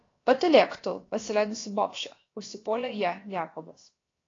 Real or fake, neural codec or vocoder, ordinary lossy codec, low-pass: fake; codec, 16 kHz, about 1 kbps, DyCAST, with the encoder's durations; AAC, 32 kbps; 7.2 kHz